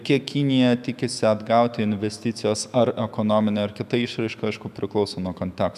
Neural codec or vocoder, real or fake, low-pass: autoencoder, 48 kHz, 128 numbers a frame, DAC-VAE, trained on Japanese speech; fake; 14.4 kHz